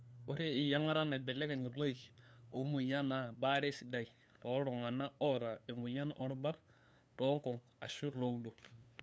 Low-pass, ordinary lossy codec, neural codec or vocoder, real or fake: none; none; codec, 16 kHz, 2 kbps, FunCodec, trained on LibriTTS, 25 frames a second; fake